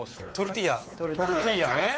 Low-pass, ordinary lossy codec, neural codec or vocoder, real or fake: none; none; codec, 16 kHz, 4 kbps, X-Codec, WavLM features, trained on Multilingual LibriSpeech; fake